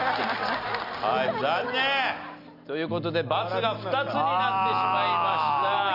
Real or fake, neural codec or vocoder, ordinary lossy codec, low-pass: real; none; AAC, 48 kbps; 5.4 kHz